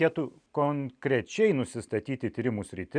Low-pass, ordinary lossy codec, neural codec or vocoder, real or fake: 9.9 kHz; MP3, 96 kbps; none; real